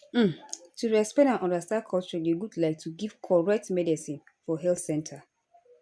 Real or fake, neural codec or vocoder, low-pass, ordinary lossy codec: real; none; none; none